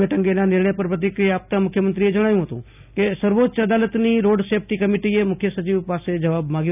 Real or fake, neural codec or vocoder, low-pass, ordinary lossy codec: real; none; 3.6 kHz; none